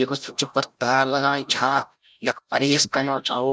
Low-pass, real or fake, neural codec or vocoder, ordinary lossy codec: none; fake; codec, 16 kHz, 0.5 kbps, FreqCodec, larger model; none